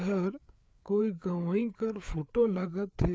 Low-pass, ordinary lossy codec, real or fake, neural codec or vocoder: none; none; fake; codec, 16 kHz, 4 kbps, FreqCodec, larger model